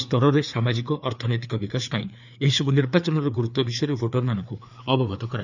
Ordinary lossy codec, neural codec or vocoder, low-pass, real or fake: none; codec, 16 kHz, 4 kbps, FreqCodec, larger model; 7.2 kHz; fake